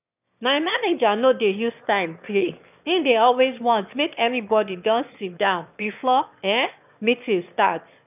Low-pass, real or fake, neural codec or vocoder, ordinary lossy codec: 3.6 kHz; fake; autoencoder, 22.05 kHz, a latent of 192 numbers a frame, VITS, trained on one speaker; none